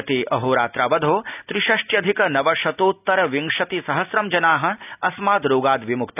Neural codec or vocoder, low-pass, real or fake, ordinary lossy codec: none; 3.6 kHz; real; none